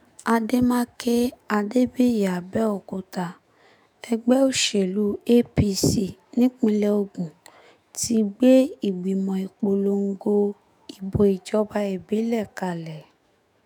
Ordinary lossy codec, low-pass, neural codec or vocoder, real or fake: none; none; autoencoder, 48 kHz, 128 numbers a frame, DAC-VAE, trained on Japanese speech; fake